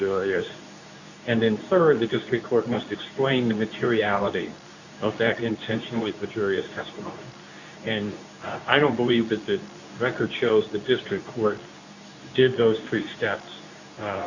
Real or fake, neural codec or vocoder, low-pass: fake; codec, 24 kHz, 0.9 kbps, WavTokenizer, medium speech release version 2; 7.2 kHz